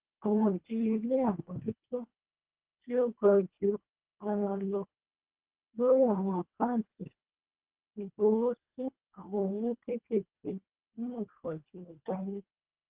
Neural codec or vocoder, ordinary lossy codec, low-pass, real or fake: codec, 24 kHz, 1.5 kbps, HILCodec; Opus, 16 kbps; 3.6 kHz; fake